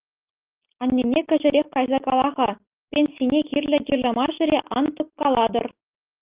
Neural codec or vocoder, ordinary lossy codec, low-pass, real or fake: none; Opus, 24 kbps; 3.6 kHz; real